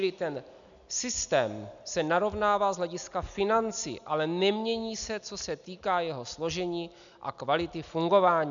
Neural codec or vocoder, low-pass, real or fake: none; 7.2 kHz; real